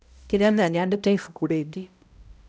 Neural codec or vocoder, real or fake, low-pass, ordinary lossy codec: codec, 16 kHz, 0.5 kbps, X-Codec, HuBERT features, trained on balanced general audio; fake; none; none